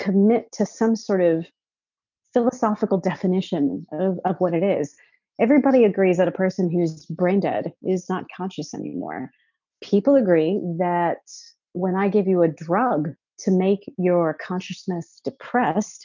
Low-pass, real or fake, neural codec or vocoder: 7.2 kHz; real; none